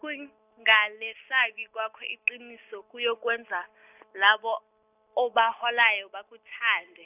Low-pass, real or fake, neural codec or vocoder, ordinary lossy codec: 3.6 kHz; real; none; none